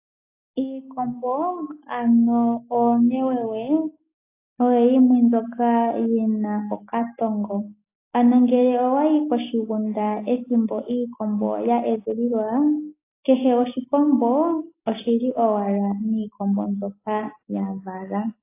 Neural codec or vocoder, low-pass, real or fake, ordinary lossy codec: none; 3.6 kHz; real; AAC, 24 kbps